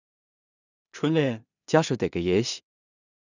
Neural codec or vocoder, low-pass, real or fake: codec, 16 kHz in and 24 kHz out, 0.4 kbps, LongCat-Audio-Codec, two codebook decoder; 7.2 kHz; fake